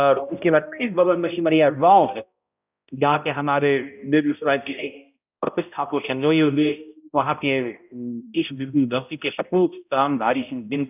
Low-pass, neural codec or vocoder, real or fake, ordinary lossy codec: 3.6 kHz; codec, 16 kHz, 0.5 kbps, X-Codec, HuBERT features, trained on balanced general audio; fake; none